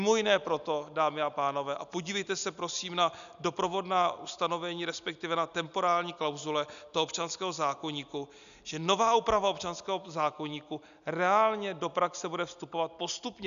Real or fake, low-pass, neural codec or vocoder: real; 7.2 kHz; none